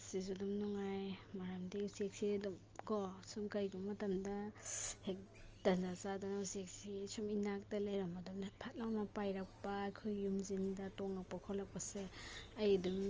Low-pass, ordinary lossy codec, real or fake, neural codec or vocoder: 7.2 kHz; Opus, 24 kbps; real; none